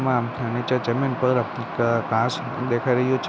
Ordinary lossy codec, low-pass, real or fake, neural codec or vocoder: none; none; real; none